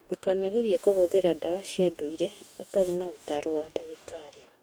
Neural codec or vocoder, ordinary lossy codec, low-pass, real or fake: codec, 44.1 kHz, 2.6 kbps, DAC; none; none; fake